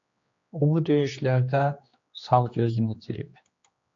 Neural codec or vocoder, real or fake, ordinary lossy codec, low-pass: codec, 16 kHz, 2 kbps, X-Codec, HuBERT features, trained on general audio; fake; AAC, 48 kbps; 7.2 kHz